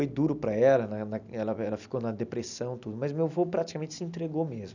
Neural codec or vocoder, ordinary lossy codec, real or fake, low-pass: none; none; real; 7.2 kHz